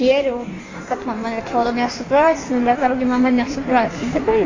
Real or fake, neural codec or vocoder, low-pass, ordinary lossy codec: fake; codec, 16 kHz in and 24 kHz out, 1.1 kbps, FireRedTTS-2 codec; 7.2 kHz; AAC, 32 kbps